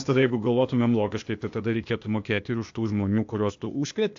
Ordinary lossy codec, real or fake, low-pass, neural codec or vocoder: AAC, 64 kbps; fake; 7.2 kHz; codec, 16 kHz, 0.8 kbps, ZipCodec